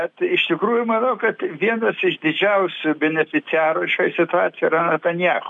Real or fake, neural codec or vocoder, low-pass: real; none; 10.8 kHz